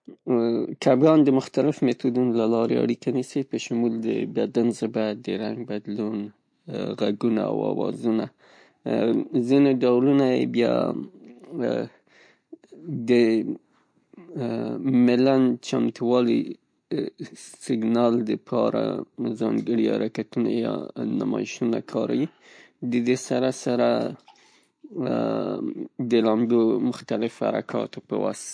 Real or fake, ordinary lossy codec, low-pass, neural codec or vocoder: real; MP3, 48 kbps; 9.9 kHz; none